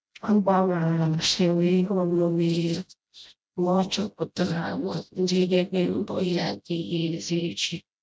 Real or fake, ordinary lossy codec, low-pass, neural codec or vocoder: fake; none; none; codec, 16 kHz, 0.5 kbps, FreqCodec, smaller model